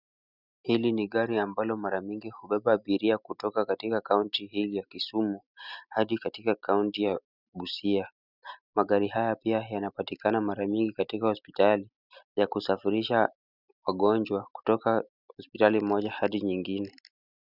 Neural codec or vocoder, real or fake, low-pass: none; real; 5.4 kHz